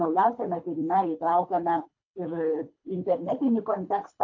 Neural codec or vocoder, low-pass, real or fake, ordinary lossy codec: codec, 24 kHz, 3 kbps, HILCodec; 7.2 kHz; fake; AAC, 48 kbps